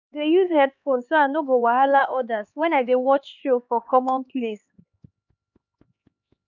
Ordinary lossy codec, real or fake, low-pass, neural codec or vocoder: none; fake; 7.2 kHz; codec, 16 kHz, 4 kbps, X-Codec, HuBERT features, trained on LibriSpeech